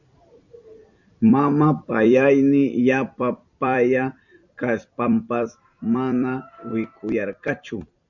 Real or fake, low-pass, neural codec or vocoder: fake; 7.2 kHz; vocoder, 44.1 kHz, 128 mel bands every 256 samples, BigVGAN v2